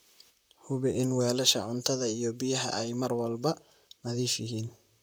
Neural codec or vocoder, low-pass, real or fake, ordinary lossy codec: vocoder, 44.1 kHz, 128 mel bands, Pupu-Vocoder; none; fake; none